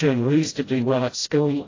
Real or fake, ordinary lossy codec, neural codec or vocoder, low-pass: fake; AAC, 32 kbps; codec, 16 kHz, 0.5 kbps, FreqCodec, smaller model; 7.2 kHz